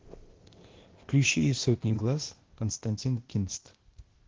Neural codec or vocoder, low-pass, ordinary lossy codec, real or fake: codec, 16 kHz, 0.8 kbps, ZipCodec; 7.2 kHz; Opus, 16 kbps; fake